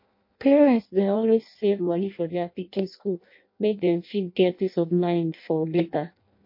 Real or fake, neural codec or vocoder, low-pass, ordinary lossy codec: fake; codec, 16 kHz in and 24 kHz out, 0.6 kbps, FireRedTTS-2 codec; 5.4 kHz; MP3, 32 kbps